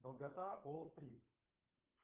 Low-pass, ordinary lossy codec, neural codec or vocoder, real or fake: 3.6 kHz; Opus, 32 kbps; codec, 16 kHz, 16 kbps, FunCodec, trained on LibriTTS, 50 frames a second; fake